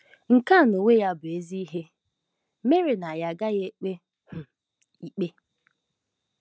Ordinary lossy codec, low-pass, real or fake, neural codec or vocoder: none; none; real; none